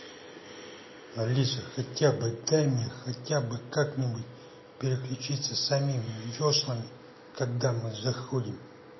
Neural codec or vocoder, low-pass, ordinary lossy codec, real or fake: none; 7.2 kHz; MP3, 24 kbps; real